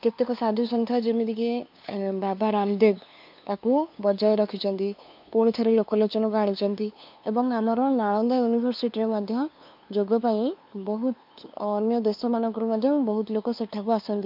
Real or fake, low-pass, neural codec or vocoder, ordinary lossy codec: fake; 5.4 kHz; codec, 16 kHz, 2 kbps, FunCodec, trained on LibriTTS, 25 frames a second; MP3, 48 kbps